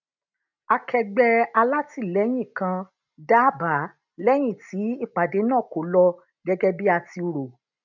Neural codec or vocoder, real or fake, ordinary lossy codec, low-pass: none; real; none; 7.2 kHz